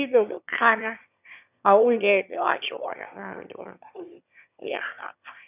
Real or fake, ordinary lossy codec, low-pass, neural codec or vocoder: fake; none; 3.6 kHz; autoencoder, 22.05 kHz, a latent of 192 numbers a frame, VITS, trained on one speaker